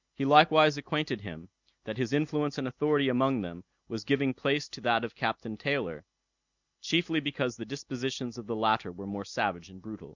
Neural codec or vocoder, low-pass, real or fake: none; 7.2 kHz; real